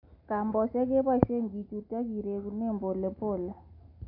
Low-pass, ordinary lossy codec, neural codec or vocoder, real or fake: 5.4 kHz; none; none; real